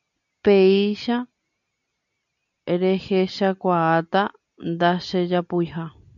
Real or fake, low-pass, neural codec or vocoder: real; 7.2 kHz; none